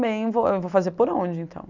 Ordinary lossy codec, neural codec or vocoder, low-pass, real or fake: none; none; 7.2 kHz; real